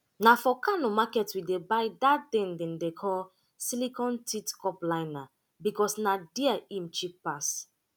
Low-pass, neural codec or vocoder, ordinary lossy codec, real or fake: none; none; none; real